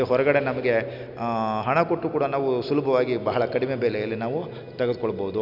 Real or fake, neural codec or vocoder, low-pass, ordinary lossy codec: real; none; 5.4 kHz; none